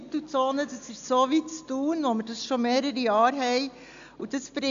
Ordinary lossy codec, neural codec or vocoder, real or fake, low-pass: MP3, 64 kbps; none; real; 7.2 kHz